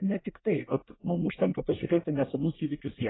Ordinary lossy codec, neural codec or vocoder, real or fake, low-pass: AAC, 16 kbps; codec, 24 kHz, 1 kbps, SNAC; fake; 7.2 kHz